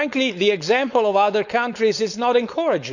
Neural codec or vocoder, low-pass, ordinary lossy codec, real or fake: codec, 16 kHz, 4.8 kbps, FACodec; 7.2 kHz; none; fake